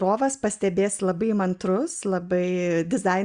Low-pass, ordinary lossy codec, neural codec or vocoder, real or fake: 9.9 kHz; Opus, 64 kbps; none; real